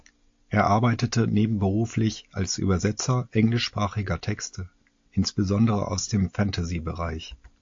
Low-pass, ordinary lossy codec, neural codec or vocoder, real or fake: 7.2 kHz; AAC, 48 kbps; none; real